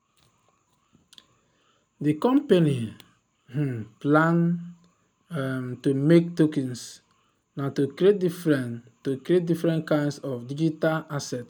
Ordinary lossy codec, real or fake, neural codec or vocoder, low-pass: none; real; none; none